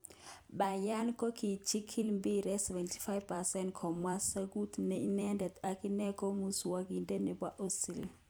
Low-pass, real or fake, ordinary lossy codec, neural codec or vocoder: none; fake; none; vocoder, 44.1 kHz, 128 mel bands every 256 samples, BigVGAN v2